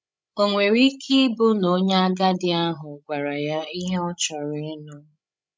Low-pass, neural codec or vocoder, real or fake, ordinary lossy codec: none; codec, 16 kHz, 16 kbps, FreqCodec, larger model; fake; none